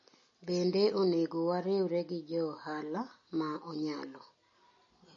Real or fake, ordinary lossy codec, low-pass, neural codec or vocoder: real; MP3, 32 kbps; 7.2 kHz; none